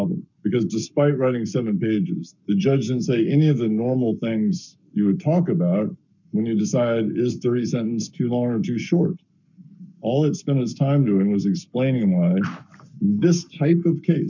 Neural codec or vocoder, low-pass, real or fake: codec, 44.1 kHz, 7.8 kbps, Pupu-Codec; 7.2 kHz; fake